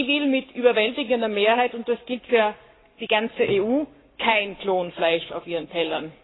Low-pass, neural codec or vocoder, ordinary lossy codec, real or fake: 7.2 kHz; codec, 44.1 kHz, 7.8 kbps, Pupu-Codec; AAC, 16 kbps; fake